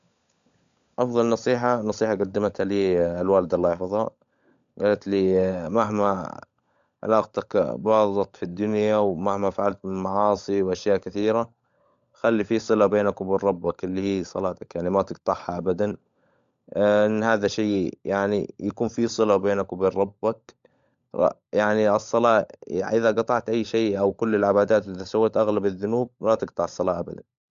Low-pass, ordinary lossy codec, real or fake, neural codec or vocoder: 7.2 kHz; MP3, 64 kbps; fake; codec, 16 kHz, 16 kbps, FunCodec, trained on LibriTTS, 50 frames a second